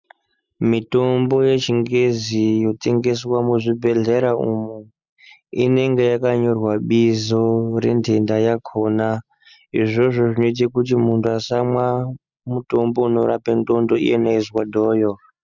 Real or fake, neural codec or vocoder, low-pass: real; none; 7.2 kHz